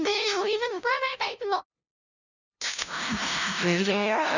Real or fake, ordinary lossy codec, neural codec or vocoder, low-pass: fake; none; codec, 16 kHz, 0.5 kbps, FunCodec, trained on LibriTTS, 25 frames a second; 7.2 kHz